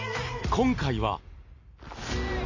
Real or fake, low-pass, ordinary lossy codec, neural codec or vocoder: real; 7.2 kHz; AAC, 48 kbps; none